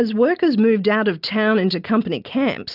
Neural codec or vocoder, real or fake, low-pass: none; real; 5.4 kHz